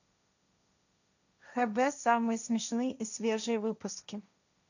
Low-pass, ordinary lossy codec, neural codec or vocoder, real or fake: 7.2 kHz; none; codec, 16 kHz, 1.1 kbps, Voila-Tokenizer; fake